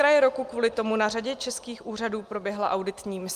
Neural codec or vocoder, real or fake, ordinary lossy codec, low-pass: none; real; Opus, 32 kbps; 14.4 kHz